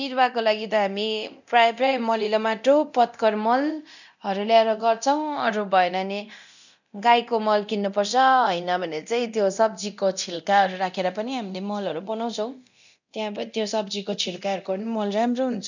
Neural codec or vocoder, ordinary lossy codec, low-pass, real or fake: codec, 24 kHz, 0.9 kbps, DualCodec; none; 7.2 kHz; fake